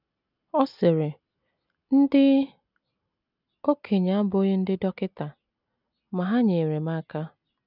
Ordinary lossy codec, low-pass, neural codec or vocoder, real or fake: none; 5.4 kHz; none; real